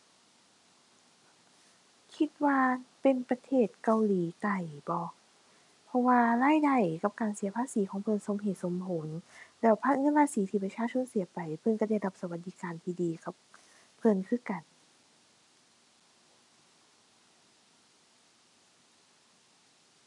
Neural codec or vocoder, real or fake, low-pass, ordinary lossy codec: none; real; 10.8 kHz; none